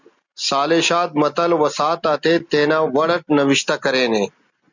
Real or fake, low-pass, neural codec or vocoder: real; 7.2 kHz; none